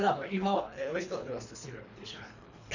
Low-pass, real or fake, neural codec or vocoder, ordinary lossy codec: 7.2 kHz; fake; codec, 24 kHz, 3 kbps, HILCodec; none